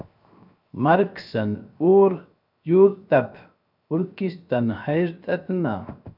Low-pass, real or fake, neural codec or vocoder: 5.4 kHz; fake; codec, 16 kHz, 0.7 kbps, FocalCodec